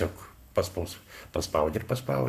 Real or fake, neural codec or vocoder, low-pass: fake; codec, 44.1 kHz, 7.8 kbps, Pupu-Codec; 14.4 kHz